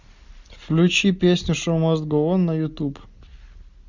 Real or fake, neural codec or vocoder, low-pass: real; none; 7.2 kHz